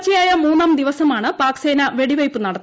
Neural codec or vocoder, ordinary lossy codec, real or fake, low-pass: none; none; real; none